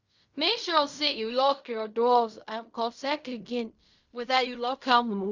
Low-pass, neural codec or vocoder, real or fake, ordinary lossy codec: 7.2 kHz; codec, 16 kHz in and 24 kHz out, 0.4 kbps, LongCat-Audio-Codec, fine tuned four codebook decoder; fake; Opus, 64 kbps